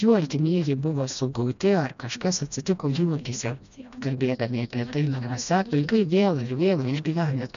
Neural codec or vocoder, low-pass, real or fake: codec, 16 kHz, 1 kbps, FreqCodec, smaller model; 7.2 kHz; fake